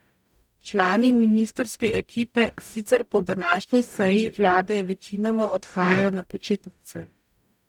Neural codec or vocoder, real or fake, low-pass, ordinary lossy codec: codec, 44.1 kHz, 0.9 kbps, DAC; fake; 19.8 kHz; none